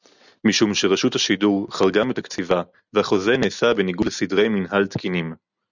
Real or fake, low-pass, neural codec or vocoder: real; 7.2 kHz; none